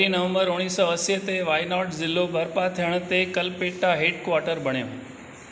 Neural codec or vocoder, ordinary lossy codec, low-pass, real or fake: none; none; none; real